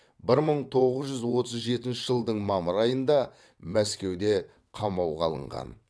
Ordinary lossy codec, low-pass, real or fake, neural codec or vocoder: none; none; fake; vocoder, 22.05 kHz, 80 mel bands, WaveNeXt